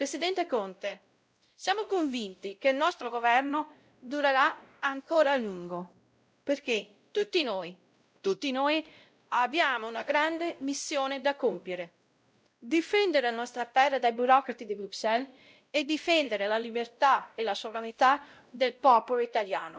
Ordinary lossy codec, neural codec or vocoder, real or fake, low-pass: none; codec, 16 kHz, 0.5 kbps, X-Codec, WavLM features, trained on Multilingual LibriSpeech; fake; none